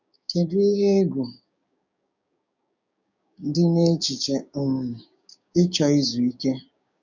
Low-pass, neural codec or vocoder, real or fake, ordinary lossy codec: 7.2 kHz; codec, 16 kHz, 6 kbps, DAC; fake; none